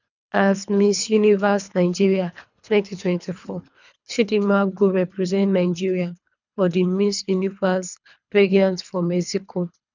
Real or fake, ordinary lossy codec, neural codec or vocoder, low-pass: fake; none; codec, 24 kHz, 3 kbps, HILCodec; 7.2 kHz